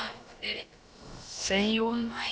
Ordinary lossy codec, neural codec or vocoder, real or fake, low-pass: none; codec, 16 kHz, about 1 kbps, DyCAST, with the encoder's durations; fake; none